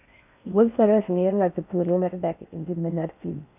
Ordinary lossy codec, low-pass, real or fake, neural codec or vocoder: none; 3.6 kHz; fake; codec, 16 kHz in and 24 kHz out, 0.6 kbps, FocalCodec, streaming, 4096 codes